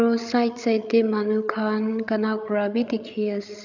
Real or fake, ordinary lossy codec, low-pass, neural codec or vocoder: fake; none; 7.2 kHz; codec, 16 kHz, 8 kbps, FreqCodec, larger model